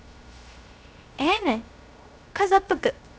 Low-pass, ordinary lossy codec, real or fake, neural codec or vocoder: none; none; fake; codec, 16 kHz, 0.3 kbps, FocalCodec